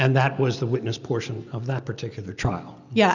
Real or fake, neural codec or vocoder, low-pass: real; none; 7.2 kHz